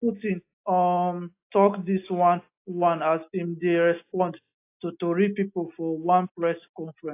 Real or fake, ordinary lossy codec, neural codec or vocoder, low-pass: real; AAC, 24 kbps; none; 3.6 kHz